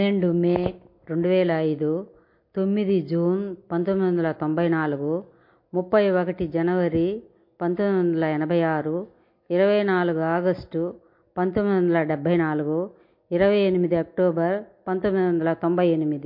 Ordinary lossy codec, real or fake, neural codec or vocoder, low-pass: MP3, 32 kbps; real; none; 5.4 kHz